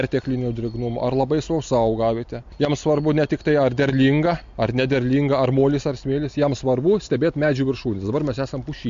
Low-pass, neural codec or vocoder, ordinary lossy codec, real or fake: 7.2 kHz; none; MP3, 48 kbps; real